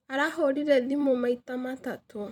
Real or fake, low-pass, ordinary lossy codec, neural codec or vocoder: fake; 19.8 kHz; none; vocoder, 44.1 kHz, 128 mel bands every 256 samples, BigVGAN v2